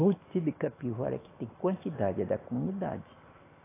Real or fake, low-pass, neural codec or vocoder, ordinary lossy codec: real; 3.6 kHz; none; AAC, 16 kbps